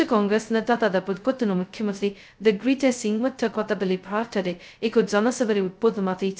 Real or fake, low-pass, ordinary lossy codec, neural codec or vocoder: fake; none; none; codec, 16 kHz, 0.2 kbps, FocalCodec